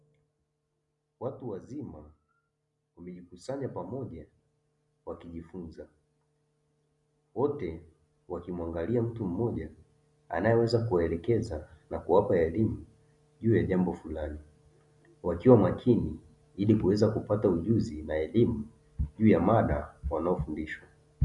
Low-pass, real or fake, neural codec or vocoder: 10.8 kHz; real; none